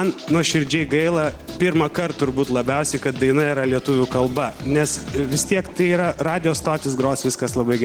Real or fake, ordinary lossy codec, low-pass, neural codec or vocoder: real; Opus, 16 kbps; 19.8 kHz; none